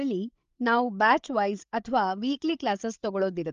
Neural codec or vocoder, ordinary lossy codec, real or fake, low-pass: codec, 16 kHz, 8 kbps, FreqCodec, larger model; Opus, 32 kbps; fake; 7.2 kHz